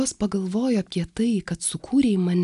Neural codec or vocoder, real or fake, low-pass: none; real; 10.8 kHz